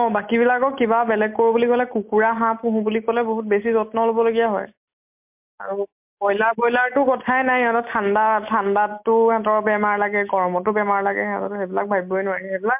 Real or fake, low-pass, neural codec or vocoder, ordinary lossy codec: real; 3.6 kHz; none; MP3, 32 kbps